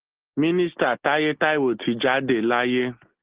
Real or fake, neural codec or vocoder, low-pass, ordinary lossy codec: real; none; 3.6 kHz; Opus, 16 kbps